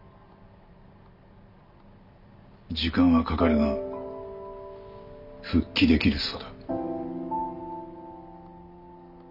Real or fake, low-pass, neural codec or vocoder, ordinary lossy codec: real; 5.4 kHz; none; none